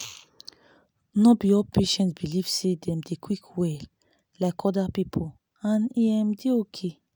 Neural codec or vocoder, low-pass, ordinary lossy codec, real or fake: none; none; none; real